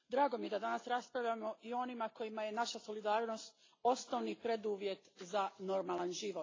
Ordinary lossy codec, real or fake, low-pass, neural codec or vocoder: AAC, 32 kbps; real; 7.2 kHz; none